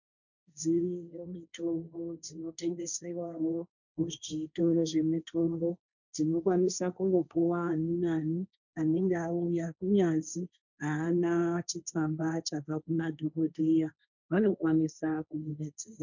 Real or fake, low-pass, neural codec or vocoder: fake; 7.2 kHz; codec, 16 kHz, 1.1 kbps, Voila-Tokenizer